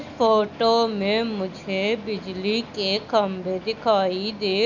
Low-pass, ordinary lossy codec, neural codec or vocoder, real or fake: 7.2 kHz; none; none; real